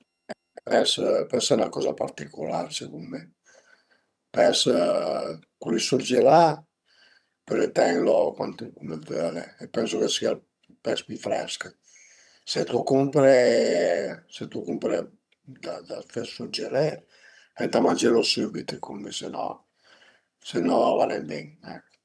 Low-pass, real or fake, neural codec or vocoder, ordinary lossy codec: none; fake; vocoder, 22.05 kHz, 80 mel bands, HiFi-GAN; none